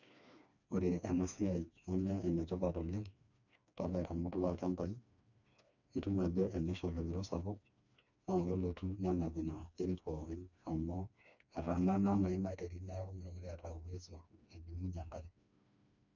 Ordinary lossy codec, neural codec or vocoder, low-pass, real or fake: none; codec, 16 kHz, 2 kbps, FreqCodec, smaller model; 7.2 kHz; fake